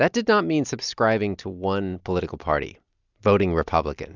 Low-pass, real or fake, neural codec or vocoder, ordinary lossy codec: 7.2 kHz; real; none; Opus, 64 kbps